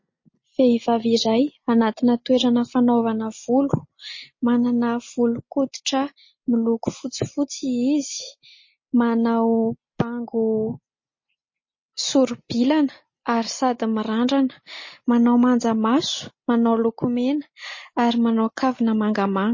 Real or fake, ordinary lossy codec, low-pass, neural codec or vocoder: real; MP3, 32 kbps; 7.2 kHz; none